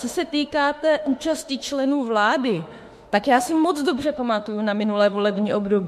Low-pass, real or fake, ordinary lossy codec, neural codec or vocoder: 14.4 kHz; fake; MP3, 64 kbps; autoencoder, 48 kHz, 32 numbers a frame, DAC-VAE, trained on Japanese speech